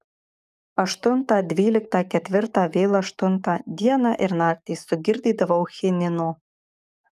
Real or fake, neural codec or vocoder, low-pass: fake; codec, 44.1 kHz, 7.8 kbps, DAC; 14.4 kHz